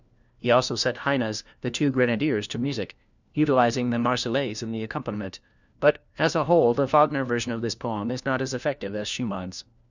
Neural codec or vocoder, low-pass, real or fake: codec, 16 kHz, 1 kbps, FunCodec, trained on LibriTTS, 50 frames a second; 7.2 kHz; fake